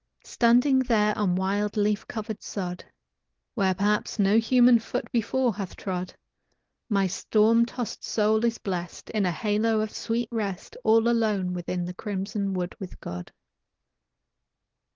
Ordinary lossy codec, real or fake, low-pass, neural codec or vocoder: Opus, 16 kbps; real; 7.2 kHz; none